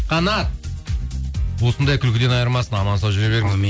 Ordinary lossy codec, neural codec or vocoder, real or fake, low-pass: none; none; real; none